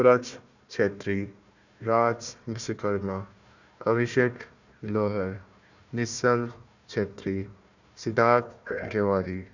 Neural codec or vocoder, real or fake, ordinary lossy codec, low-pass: codec, 16 kHz, 1 kbps, FunCodec, trained on Chinese and English, 50 frames a second; fake; none; 7.2 kHz